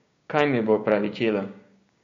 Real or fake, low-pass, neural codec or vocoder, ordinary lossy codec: fake; 7.2 kHz; codec, 16 kHz, 6 kbps, DAC; MP3, 48 kbps